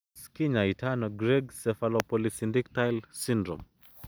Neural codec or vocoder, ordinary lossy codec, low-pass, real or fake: none; none; none; real